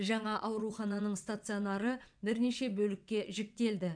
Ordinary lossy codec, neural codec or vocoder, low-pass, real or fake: none; vocoder, 22.05 kHz, 80 mel bands, WaveNeXt; 9.9 kHz; fake